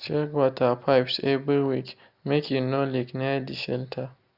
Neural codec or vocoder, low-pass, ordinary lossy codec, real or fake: none; 5.4 kHz; Opus, 64 kbps; real